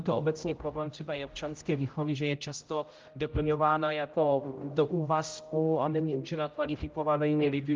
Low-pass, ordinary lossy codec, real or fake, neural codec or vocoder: 7.2 kHz; Opus, 32 kbps; fake; codec, 16 kHz, 0.5 kbps, X-Codec, HuBERT features, trained on general audio